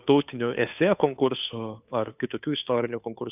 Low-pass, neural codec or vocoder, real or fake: 3.6 kHz; codec, 16 kHz, 2 kbps, FunCodec, trained on Chinese and English, 25 frames a second; fake